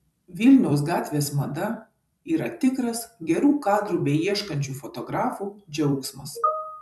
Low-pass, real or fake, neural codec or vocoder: 14.4 kHz; fake; vocoder, 44.1 kHz, 128 mel bands every 256 samples, BigVGAN v2